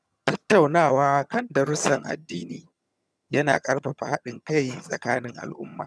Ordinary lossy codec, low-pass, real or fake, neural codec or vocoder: none; none; fake; vocoder, 22.05 kHz, 80 mel bands, HiFi-GAN